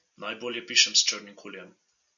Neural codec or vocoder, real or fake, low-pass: none; real; 7.2 kHz